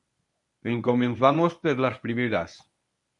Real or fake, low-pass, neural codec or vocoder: fake; 10.8 kHz; codec, 24 kHz, 0.9 kbps, WavTokenizer, medium speech release version 1